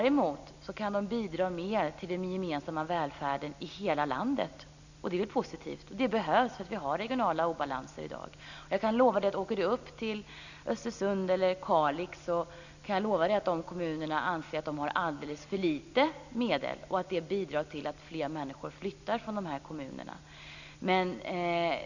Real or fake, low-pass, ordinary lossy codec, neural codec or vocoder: real; 7.2 kHz; none; none